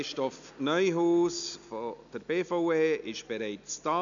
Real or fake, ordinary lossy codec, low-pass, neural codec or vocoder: real; none; 7.2 kHz; none